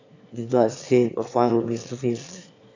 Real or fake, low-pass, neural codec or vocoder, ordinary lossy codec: fake; 7.2 kHz; autoencoder, 22.05 kHz, a latent of 192 numbers a frame, VITS, trained on one speaker; AAC, 48 kbps